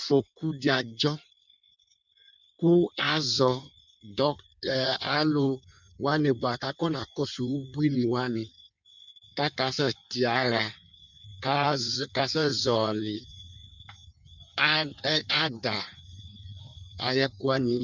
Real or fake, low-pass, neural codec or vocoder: fake; 7.2 kHz; codec, 16 kHz in and 24 kHz out, 1.1 kbps, FireRedTTS-2 codec